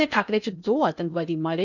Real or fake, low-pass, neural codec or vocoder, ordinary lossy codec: fake; 7.2 kHz; codec, 16 kHz in and 24 kHz out, 0.8 kbps, FocalCodec, streaming, 65536 codes; none